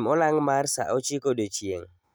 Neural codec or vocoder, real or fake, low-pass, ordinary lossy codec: none; real; none; none